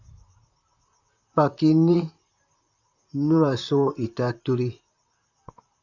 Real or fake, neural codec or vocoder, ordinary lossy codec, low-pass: fake; vocoder, 44.1 kHz, 128 mel bands, Pupu-Vocoder; AAC, 48 kbps; 7.2 kHz